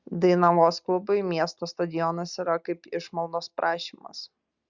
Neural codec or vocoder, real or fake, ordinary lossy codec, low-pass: none; real; Opus, 64 kbps; 7.2 kHz